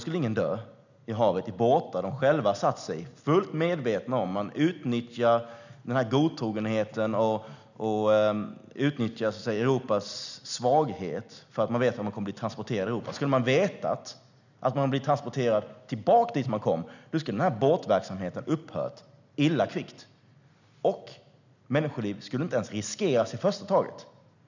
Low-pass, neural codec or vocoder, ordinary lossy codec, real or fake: 7.2 kHz; none; none; real